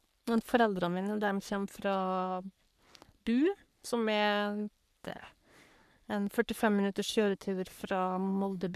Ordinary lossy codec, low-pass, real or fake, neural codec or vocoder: none; 14.4 kHz; fake; codec, 44.1 kHz, 3.4 kbps, Pupu-Codec